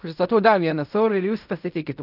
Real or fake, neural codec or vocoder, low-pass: fake; codec, 16 kHz in and 24 kHz out, 0.4 kbps, LongCat-Audio-Codec, fine tuned four codebook decoder; 5.4 kHz